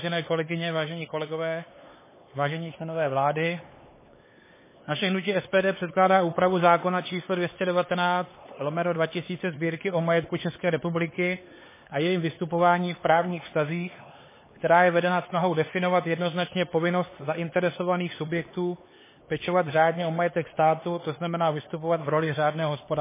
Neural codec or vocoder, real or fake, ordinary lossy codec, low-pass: codec, 16 kHz, 4 kbps, X-Codec, HuBERT features, trained on LibriSpeech; fake; MP3, 16 kbps; 3.6 kHz